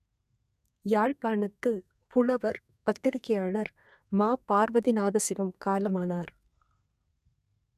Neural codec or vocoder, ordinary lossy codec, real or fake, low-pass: codec, 32 kHz, 1.9 kbps, SNAC; none; fake; 14.4 kHz